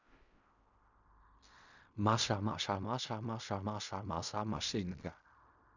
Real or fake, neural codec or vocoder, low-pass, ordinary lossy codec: fake; codec, 16 kHz in and 24 kHz out, 0.4 kbps, LongCat-Audio-Codec, fine tuned four codebook decoder; 7.2 kHz; none